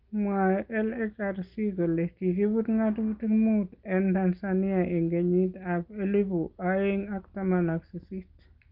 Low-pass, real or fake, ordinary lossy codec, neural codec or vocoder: 5.4 kHz; real; Opus, 32 kbps; none